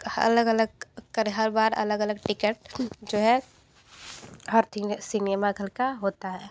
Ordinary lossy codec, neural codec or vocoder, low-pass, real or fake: none; none; none; real